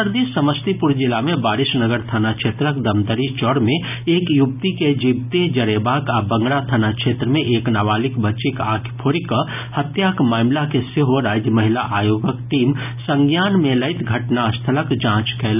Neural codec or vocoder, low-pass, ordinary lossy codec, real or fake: none; 3.6 kHz; none; real